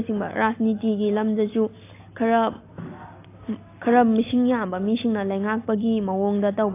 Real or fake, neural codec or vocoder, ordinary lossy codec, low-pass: real; none; AAC, 24 kbps; 3.6 kHz